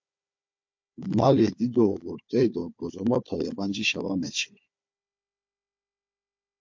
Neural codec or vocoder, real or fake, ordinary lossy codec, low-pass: codec, 16 kHz, 4 kbps, FunCodec, trained on Chinese and English, 50 frames a second; fake; MP3, 48 kbps; 7.2 kHz